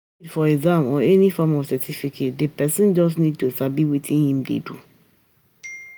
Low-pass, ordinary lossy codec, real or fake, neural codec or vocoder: none; none; real; none